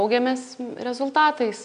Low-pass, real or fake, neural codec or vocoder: 9.9 kHz; real; none